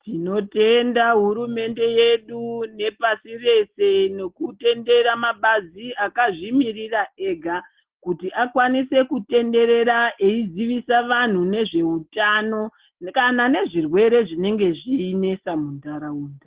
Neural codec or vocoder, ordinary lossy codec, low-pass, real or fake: none; Opus, 16 kbps; 3.6 kHz; real